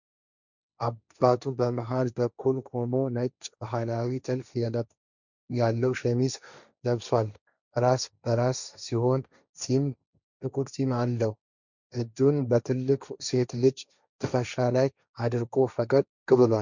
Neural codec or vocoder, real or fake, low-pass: codec, 16 kHz, 1.1 kbps, Voila-Tokenizer; fake; 7.2 kHz